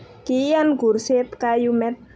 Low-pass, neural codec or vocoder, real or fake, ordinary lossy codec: none; none; real; none